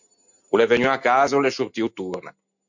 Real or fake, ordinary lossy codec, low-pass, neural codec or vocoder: real; MP3, 48 kbps; 7.2 kHz; none